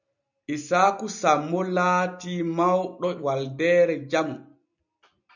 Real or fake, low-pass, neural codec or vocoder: real; 7.2 kHz; none